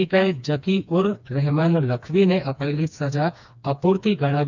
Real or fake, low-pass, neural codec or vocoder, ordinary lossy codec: fake; 7.2 kHz; codec, 16 kHz, 2 kbps, FreqCodec, smaller model; none